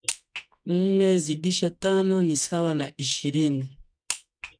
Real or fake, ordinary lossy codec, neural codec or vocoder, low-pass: fake; none; codec, 24 kHz, 0.9 kbps, WavTokenizer, medium music audio release; 9.9 kHz